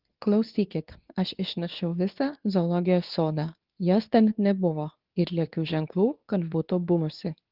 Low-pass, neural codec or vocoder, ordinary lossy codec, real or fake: 5.4 kHz; codec, 24 kHz, 0.9 kbps, WavTokenizer, medium speech release version 2; Opus, 32 kbps; fake